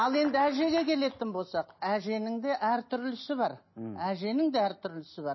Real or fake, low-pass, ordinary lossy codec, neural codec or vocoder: real; 7.2 kHz; MP3, 24 kbps; none